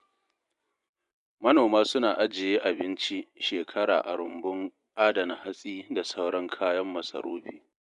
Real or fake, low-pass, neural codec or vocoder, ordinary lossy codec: fake; 10.8 kHz; vocoder, 24 kHz, 100 mel bands, Vocos; none